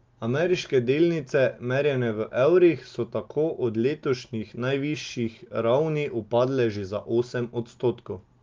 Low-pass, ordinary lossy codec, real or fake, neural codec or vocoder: 7.2 kHz; Opus, 32 kbps; real; none